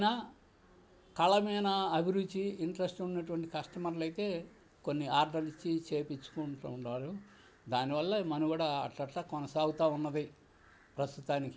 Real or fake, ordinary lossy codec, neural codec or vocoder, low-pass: real; none; none; none